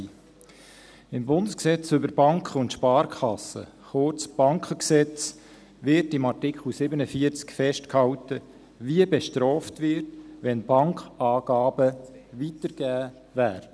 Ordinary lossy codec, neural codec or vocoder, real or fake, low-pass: none; none; real; none